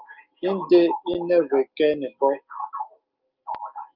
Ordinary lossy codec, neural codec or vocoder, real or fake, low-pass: Opus, 24 kbps; none; real; 5.4 kHz